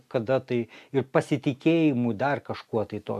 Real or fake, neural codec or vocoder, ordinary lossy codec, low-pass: real; none; AAC, 96 kbps; 14.4 kHz